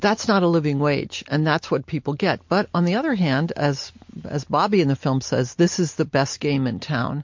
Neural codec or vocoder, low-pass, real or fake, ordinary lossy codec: none; 7.2 kHz; real; MP3, 48 kbps